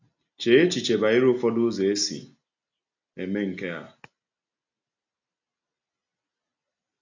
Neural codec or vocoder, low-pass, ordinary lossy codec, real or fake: none; 7.2 kHz; AAC, 48 kbps; real